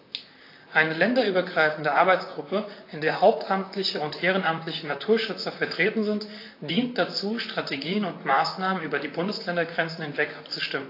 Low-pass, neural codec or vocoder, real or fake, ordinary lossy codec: 5.4 kHz; vocoder, 44.1 kHz, 128 mel bands, Pupu-Vocoder; fake; AAC, 32 kbps